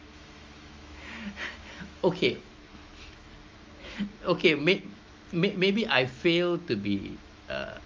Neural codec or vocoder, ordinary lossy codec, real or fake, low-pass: autoencoder, 48 kHz, 128 numbers a frame, DAC-VAE, trained on Japanese speech; Opus, 32 kbps; fake; 7.2 kHz